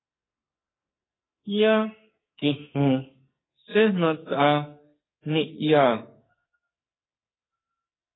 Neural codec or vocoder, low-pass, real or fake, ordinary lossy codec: codec, 44.1 kHz, 2.6 kbps, SNAC; 7.2 kHz; fake; AAC, 16 kbps